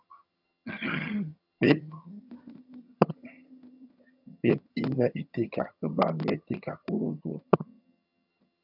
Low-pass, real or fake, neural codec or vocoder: 5.4 kHz; fake; vocoder, 22.05 kHz, 80 mel bands, HiFi-GAN